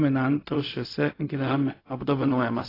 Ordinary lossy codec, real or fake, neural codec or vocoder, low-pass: AAC, 24 kbps; fake; codec, 16 kHz, 0.4 kbps, LongCat-Audio-Codec; 5.4 kHz